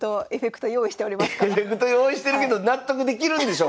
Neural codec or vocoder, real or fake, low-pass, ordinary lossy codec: none; real; none; none